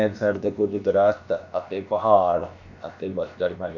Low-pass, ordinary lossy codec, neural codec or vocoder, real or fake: 7.2 kHz; none; codec, 16 kHz, about 1 kbps, DyCAST, with the encoder's durations; fake